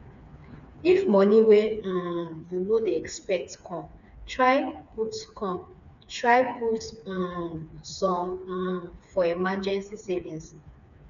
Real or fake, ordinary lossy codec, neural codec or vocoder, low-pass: fake; none; codec, 16 kHz, 4 kbps, FreqCodec, smaller model; 7.2 kHz